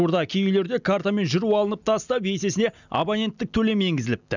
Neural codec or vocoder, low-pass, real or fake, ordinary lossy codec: none; 7.2 kHz; real; none